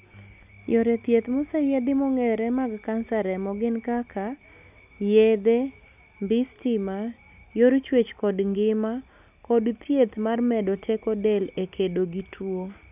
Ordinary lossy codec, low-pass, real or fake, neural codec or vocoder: none; 3.6 kHz; real; none